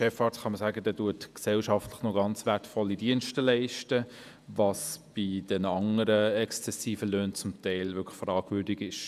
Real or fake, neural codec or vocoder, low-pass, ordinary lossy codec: fake; vocoder, 48 kHz, 128 mel bands, Vocos; 14.4 kHz; none